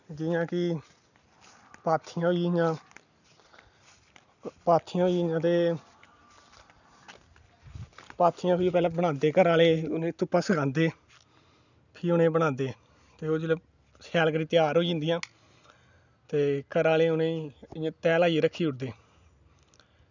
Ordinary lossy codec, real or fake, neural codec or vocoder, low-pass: none; real; none; 7.2 kHz